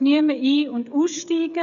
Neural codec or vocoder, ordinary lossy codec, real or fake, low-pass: codec, 16 kHz, 8 kbps, FreqCodec, smaller model; none; fake; 7.2 kHz